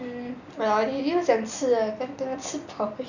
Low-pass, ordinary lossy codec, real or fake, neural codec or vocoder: 7.2 kHz; none; real; none